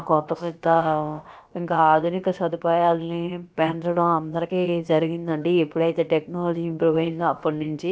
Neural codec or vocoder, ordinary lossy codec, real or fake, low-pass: codec, 16 kHz, about 1 kbps, DyCAST, with the encoder's durations; none; fake; none